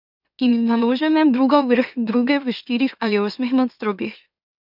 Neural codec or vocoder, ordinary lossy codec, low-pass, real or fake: autoencoder, 44.1 kHz, a latent of 192 numbers a frame, MeloTTS; none; 5.4 kHz; fake